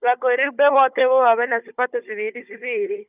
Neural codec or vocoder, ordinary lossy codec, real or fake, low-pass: codec, 16 kHz, 16 kbps, FunCodec, trained on Chinese and English, 50 frames a second; none; fake; 3.6 kHz